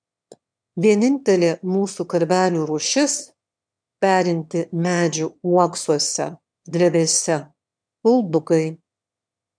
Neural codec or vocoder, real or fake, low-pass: autoencoder, 22.05 kHz, a latent of 192 numbers a frame, VITS, trained on one speaker; fake; 9.9 kHz